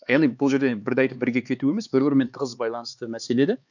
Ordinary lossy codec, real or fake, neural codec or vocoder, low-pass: none; fake; codec, 16 kHz, 4 kbps, X-Codec, HuBERT features, trained on LibriSpeech; 7.2 kHz